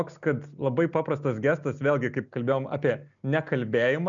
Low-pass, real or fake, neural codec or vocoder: 7.2 kHz; real; none